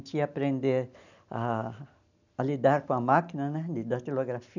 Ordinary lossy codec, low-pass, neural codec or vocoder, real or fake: none; 7.2 kHz; none; real